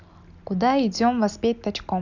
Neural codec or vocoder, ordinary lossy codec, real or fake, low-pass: none; none; real; 7.2 kHz